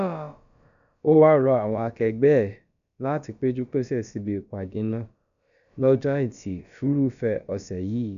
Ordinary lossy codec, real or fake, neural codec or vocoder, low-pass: none; fake; codec, 16 kHz, about 1 kbps, DyCAST, with the encoder's durations; 7.2 kHz